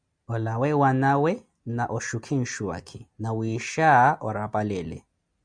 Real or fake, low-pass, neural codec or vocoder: real; 9.9 kHz; none